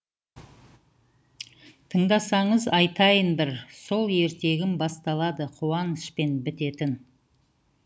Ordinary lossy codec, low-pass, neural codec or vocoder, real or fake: none; none; none; real